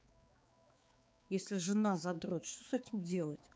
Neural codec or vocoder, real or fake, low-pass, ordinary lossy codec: codec, 16 kHz, 4 kbps, X-Codec, HuBERT features, trained on balanced general audio; fake; none; none